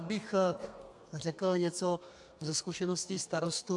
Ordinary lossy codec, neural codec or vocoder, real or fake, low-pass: AAC, 64 kbps; codec, 32 kHz, 1.9 kbps, SNAC; fake; 10.8 kHz